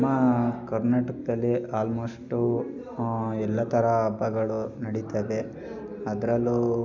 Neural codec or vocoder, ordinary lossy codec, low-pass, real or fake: none; none; 7.2 kHz; real